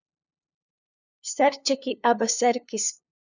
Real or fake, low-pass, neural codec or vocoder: fake; 7.2 kHz; codec, 16 kHz, 2 kbps, FunCodec, trained on LibriTTS, 25 frames a second